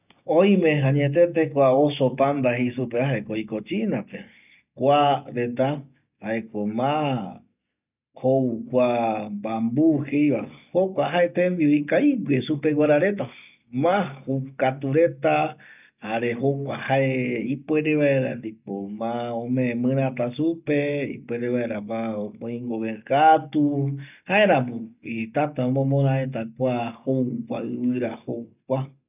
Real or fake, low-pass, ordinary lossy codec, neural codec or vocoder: real; 3.6 kHz; none; none